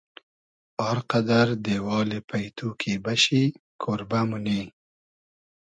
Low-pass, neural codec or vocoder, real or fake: 9.9 kHz; none; real